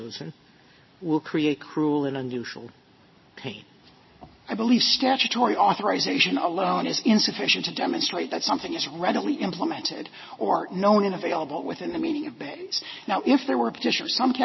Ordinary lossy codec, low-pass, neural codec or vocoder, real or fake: MP3, 24 kbps; 7.2 kHz; none; real